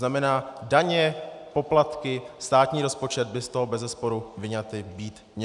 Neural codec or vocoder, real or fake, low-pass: none; real; 10.8 kHz